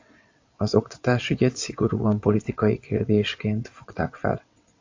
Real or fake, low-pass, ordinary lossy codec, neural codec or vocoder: fake; 7.2 kHz; AAC, 48 kbps; vocoder, 22.05 kHz, 80 mel bands, WaveNeXt